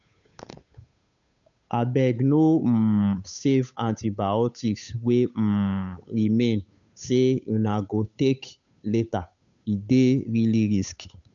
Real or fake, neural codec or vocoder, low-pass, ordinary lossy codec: fake; codec, 16 kHz, 8 kbps, FunCodec, trained on Chinese and English, 25 frames a second; 7.2 kHz; none